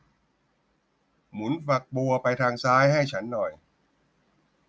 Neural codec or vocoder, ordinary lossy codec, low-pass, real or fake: none; none; none; real